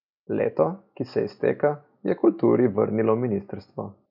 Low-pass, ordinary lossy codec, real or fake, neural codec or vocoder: 5.4 kHz; none; real; none